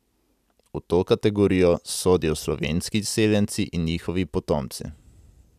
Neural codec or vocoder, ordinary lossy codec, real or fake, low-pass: none; none; real; 14.4 kHz